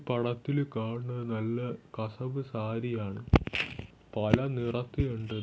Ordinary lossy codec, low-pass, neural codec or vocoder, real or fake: none; none; none; real